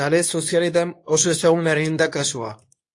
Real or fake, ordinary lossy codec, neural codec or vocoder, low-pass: fake; AAC, 48 kbps; codec, 24 kHz, 0.9 kbps, WavTokenizer, medium speech release version 2; 10.8 kHz